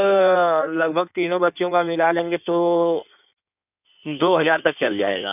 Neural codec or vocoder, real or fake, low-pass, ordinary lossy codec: codec, 16 kHz in and 24 kHz out, 1.1 kbps, FireRedTTS-2 codec; fake; 3.6 kHz; none